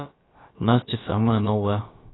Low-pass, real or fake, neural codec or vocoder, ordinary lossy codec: 7.2 kHz; fake; codec, 16 kHz, about 1 kbps, DyCAST, with the encoder's durations; AAC, 16 kbps